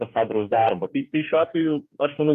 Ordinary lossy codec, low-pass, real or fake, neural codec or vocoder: AAC, 96 kbps; 14.4 kHz; fake; codec, 44.1 kHz, 2.6 kbps, DAC